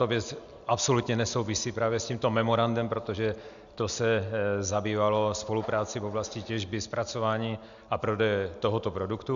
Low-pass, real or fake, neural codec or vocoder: 7.2 kHz; real; none